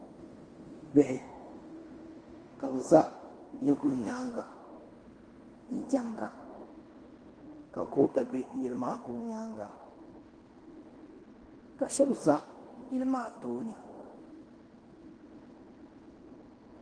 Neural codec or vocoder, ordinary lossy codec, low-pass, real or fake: codec, 16 kHz in and 24 kHz out, 0.9 kbps, LongCat-Audio-Codec, fine tuned four codebook decoder; Opus, 24 kbps; 9.9 kHz; fake